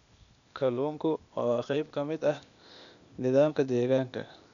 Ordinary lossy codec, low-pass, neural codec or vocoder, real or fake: none; 7.2 kHz; codec, 16 kHz, 0.8 kbps, ZipCodec; fake